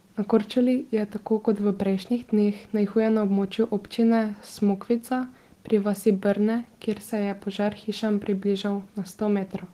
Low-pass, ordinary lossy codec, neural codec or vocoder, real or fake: 14.4 kHz; Opus, 16 kbps; none; real